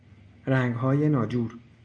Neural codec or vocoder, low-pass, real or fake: none; 9.9 kHz; real